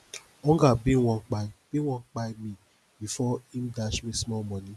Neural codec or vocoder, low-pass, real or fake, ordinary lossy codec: none; none; real; none